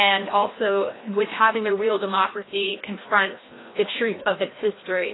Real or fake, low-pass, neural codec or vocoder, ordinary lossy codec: fake; 7.2 kHz; codec, 16 kHz, 1 kbps, FreqCodec, larger model; AAC, 16 kbps